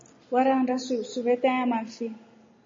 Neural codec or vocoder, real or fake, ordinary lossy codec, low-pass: none; real; MP3, 32 kbps; 7.2 kHz